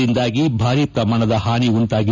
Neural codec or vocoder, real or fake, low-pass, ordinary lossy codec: none; real; 7.2 kHz; none